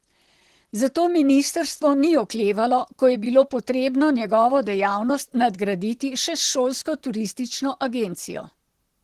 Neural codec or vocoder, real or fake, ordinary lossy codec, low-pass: vocoder, 44.1 kHz, 128 mel bands, Pupu-Vocoder; fake; Opus, 16 kbps; 14.4 kHz